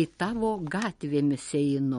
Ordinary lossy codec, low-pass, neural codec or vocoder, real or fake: MP3, 48 kbps; 19.8 kHz; none; real